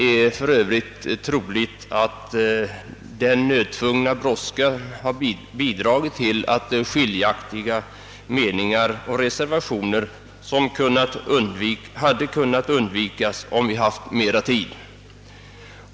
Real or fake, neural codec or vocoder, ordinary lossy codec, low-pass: real; none; none; none